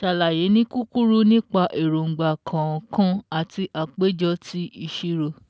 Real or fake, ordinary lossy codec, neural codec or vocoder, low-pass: real; none; none; none